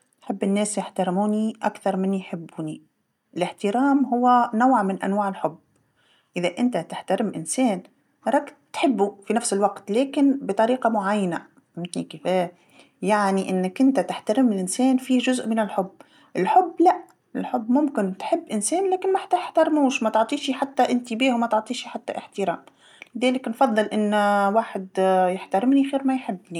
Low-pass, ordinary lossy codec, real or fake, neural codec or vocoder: 19.8 kHz; none; real; none